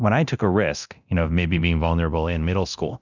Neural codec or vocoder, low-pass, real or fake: codec, 24 kHz, 0.9 kbps, DualCodec; 7.2 kHz; fake